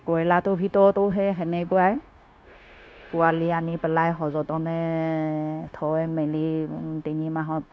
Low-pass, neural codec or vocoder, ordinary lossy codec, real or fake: none; codec, 16 kHz, 0.9 kbps, LongCat-Audio-Codec; none; fake